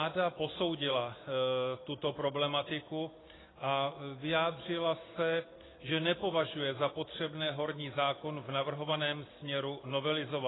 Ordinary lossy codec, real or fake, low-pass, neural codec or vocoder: AAC, 16 kbps; real; 7.2 kHz; none